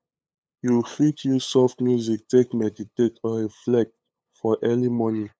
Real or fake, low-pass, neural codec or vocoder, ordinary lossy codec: fake; none; codec, 16 kHz, 8 kbps, FunCodec, trained on LibriTTS, 25 frames a second; none